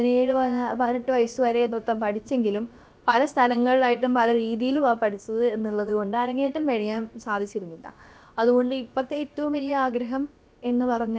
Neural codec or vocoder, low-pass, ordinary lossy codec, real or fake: codec, 16 kHz, about 1 kbps, DyCAST, with the encoder's durations; none; none; fake